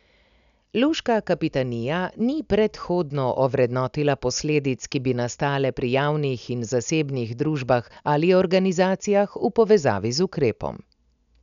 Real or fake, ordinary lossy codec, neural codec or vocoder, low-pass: real; none; none; 7.2 kHz